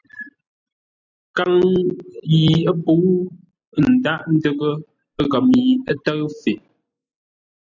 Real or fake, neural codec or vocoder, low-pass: real; none; 7.2 kHz